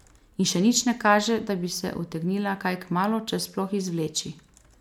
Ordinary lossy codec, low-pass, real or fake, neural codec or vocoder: none; 19.8 kHz; real; none